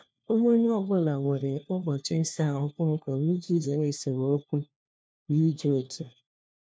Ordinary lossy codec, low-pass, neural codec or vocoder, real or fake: none; none; codec, 16 kHz, 1 kbps, FunCodec, trained on LibriTTS, 50 frames a second; fake